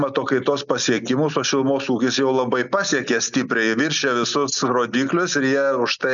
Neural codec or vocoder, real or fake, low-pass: none; real; 7.2 kHz